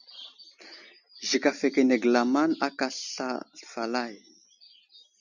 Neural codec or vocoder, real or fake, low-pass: none; real; 7.2 kHz